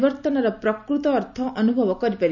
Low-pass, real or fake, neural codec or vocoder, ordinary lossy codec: 7.2 kHz; real; none; none